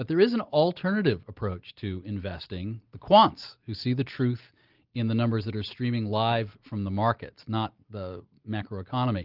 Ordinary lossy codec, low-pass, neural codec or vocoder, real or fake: Opus, 32 kbps; 5.4 kHz; none; real